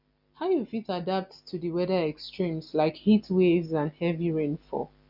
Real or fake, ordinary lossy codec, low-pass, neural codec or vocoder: real; none; 5.4 kHz; none